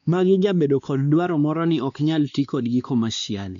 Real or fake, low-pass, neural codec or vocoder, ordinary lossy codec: fake; 7.2 kHz; codec, 16 kHz, 2 kbps, X-Codec, WavLM features, trained on Multilingual LibriSpeech; none